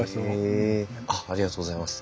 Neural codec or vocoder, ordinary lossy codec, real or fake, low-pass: none; none; real; none